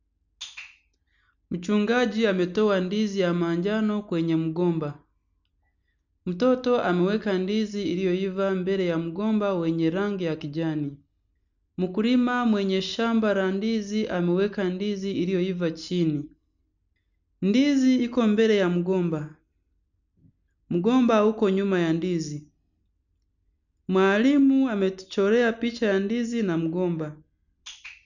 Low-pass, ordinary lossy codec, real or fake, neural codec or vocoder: 7.2 kHz; none; real; none